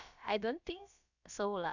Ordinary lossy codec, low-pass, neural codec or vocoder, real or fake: none; 7.2 kHz; codec, 16 kHz, about 1 kbps, DyCAST, with the encoder's durations; fake